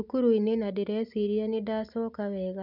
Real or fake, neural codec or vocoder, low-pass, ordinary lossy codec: real; none; 5.4 kHz; none